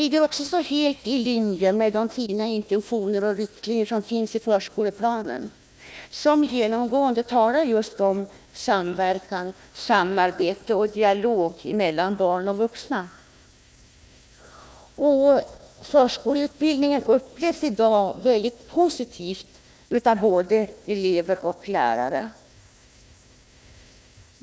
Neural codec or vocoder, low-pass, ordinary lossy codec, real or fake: codec, 16 kHz, 1 kbps, FunCodec, trained on Chinese and English, 50 frames a second; none; none; fake